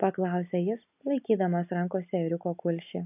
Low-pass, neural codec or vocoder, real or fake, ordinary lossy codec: 3.6 kHz; none; real; AAC, 32 kbps